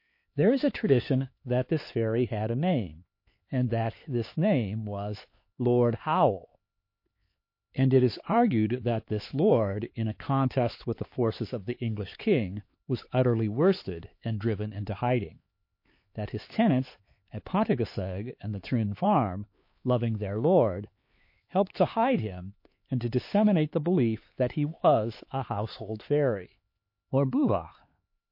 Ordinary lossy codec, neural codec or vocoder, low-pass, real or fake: MP3, 32 kbps; codec, 16 kHz, 4 kbps, X-Codec, HuBERT features, trained on LibriSpeech; 5.4 kHz; fake